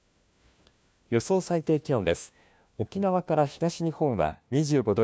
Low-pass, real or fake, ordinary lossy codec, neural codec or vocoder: none; fake; none; codec, 16 kHz, 1 kbps, FunCodec, trained on LibriTTS, 50 frames a second